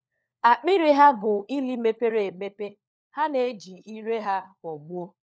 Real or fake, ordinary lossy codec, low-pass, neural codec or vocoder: fake; none; none; codec, 16 kHz, 4 kbps, FunCodec, trained on LibriTTS, 50 frames a second